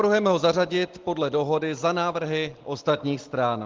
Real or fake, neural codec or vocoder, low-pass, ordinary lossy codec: real; none; 7.2 kHz; Opus, 16 kbps